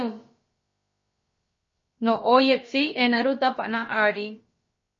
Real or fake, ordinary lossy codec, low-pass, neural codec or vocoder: fake; MP3, 32 kbps; 7.2 kHz; codec, 16 kHz, about 1 kbps, DyCAST, with the encoder's durations